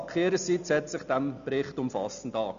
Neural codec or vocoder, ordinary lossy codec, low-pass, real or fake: none; none; 7.2 kHz; real